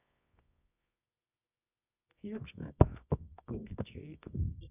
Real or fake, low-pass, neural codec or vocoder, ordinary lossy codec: fake; 3.6 kHz; codec, 24 kHz, 0.9 kbps, WavTokenizer, medium music audio release; none